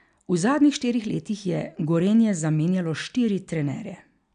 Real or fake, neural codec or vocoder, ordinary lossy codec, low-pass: real; none; none; 9.9 kHz